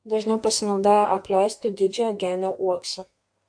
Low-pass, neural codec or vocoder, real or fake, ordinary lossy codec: 9.9 kHz; codec, 32 kHz, 1.9 kbps, SNAC; fake; AAC, 64 kbps